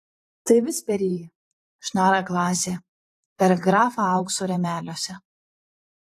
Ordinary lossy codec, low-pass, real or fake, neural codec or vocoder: AAC, 48 kbps; 14.4 kHz; fake; vocoder, 44.1 kHz, 128 mel bands every 512 samples, BigVGAN v2